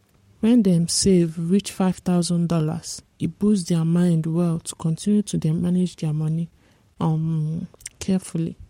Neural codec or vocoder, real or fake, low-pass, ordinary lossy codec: codec, 44.1 kHz, 7.8 kbps, Pupu-Codec; fake; 19.8 kHz; MP3, 64 kbps